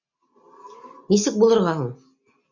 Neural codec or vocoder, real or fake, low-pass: none; real; 7.2 kHz